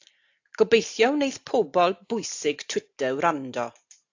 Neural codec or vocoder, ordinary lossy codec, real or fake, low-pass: none; AAC, 48 kbps; real; 7.2 kHz